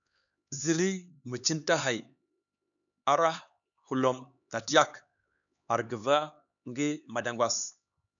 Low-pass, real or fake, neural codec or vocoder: 7.2 kHz; fake; codec, 16 kHz, 4 kbps, X-Codec, HuBERT features, trained on LibriSpeech